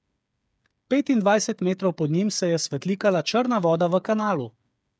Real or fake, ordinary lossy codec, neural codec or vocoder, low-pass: fake; none; codec, 16 kHz, 8 kbps, FreqCodec, smaller model; none